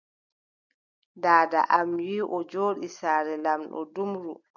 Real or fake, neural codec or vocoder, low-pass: real; none; 7.2 kHz